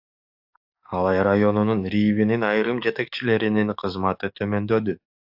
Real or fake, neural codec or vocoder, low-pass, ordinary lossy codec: fake; codec, 44.1 kHz, 7.8 kbps, DAC; 5.4 kHz; AAC, 48 kbps